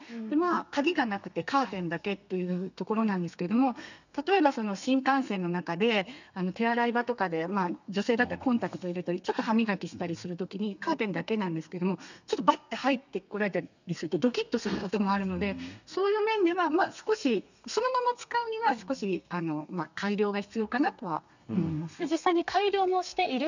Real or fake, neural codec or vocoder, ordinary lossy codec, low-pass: fake; codec, 32 kHz, 1.9 kbps, SNAC; none; 7.2 kHz